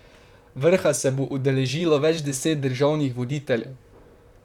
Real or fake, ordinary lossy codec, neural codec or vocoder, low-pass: fake; none; vocoder, 44.1 kHz, 128 mel bands, Pupu-Vocoder; 19.8 kHz